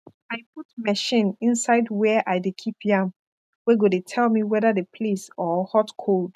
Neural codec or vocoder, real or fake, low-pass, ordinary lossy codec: none; real; 14.4 kHz; none